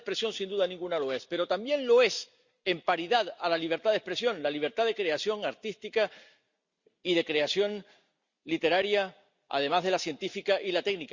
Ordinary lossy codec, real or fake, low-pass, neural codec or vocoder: Opus, 64 kbps; real; 7.2 kHz; none